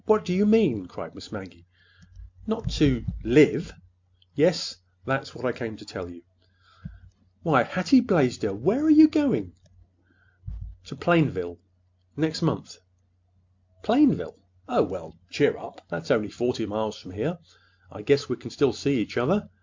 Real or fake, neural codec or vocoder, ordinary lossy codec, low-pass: real; none; MP3, 64 kbps; 7.2 kHz